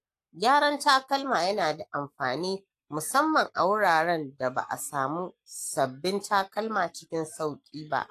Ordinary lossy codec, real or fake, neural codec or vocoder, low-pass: AAC, 64 kbps; fake; codec, 44.1 kHz, 7.8 kbps, Pupu-Codec; 14.4 kHz